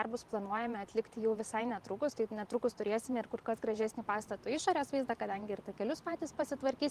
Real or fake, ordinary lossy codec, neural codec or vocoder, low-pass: fake; Opus, 24 kbps; vocoder, 44.1 kHz, 128 mel bands every 512 samples, BigVGAN v2; 14.4 kHz